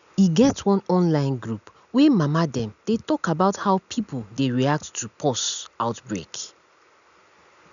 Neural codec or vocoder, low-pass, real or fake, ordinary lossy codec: none; 7.2 kHz; real; none